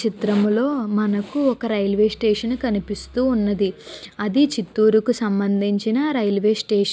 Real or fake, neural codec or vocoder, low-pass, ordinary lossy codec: real; none; none; none